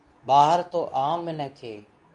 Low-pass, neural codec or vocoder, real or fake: 10.8 kHz; codec, 24 kHz, 0.9 kbps, WavTokenizer, medium speech release version 2; fake